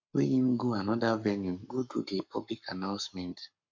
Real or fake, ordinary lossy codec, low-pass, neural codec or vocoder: fake; MP3, 48 kbps; 7.2 kHz; codec, 44.1 kHz, 7.8 kbps, Pupu-Codec